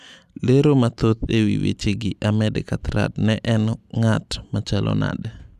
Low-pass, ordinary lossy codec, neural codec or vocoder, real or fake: 14.4 kHz; none; none; real